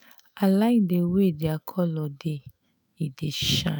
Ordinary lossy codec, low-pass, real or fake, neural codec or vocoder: none; none; fake; autoencoder, 48 kHz, 128 numbers a frame, DAC-VAE, trained on Japanese speech